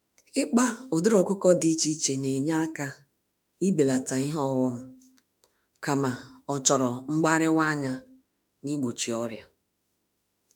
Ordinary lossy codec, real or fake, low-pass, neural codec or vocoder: none; fake; none; autoencoder, 48 kHz, 32 numbers a frame, DAC-VAE, trained on Japanese speech